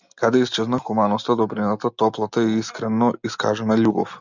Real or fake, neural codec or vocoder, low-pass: real; none; 7.2 kHz